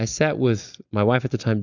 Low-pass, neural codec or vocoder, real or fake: 7.2 kHz; none; real